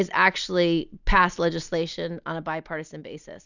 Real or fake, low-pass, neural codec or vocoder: real; 7.2 kHz; none